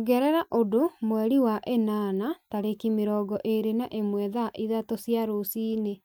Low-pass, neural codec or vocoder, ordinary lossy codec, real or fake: none; none; none; real